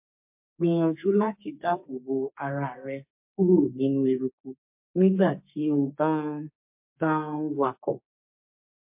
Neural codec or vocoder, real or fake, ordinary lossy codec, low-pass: codec, 32 kHz, 1.9 kbps, SNAC; fake; MP3, 32 kbps; 3.6 kHz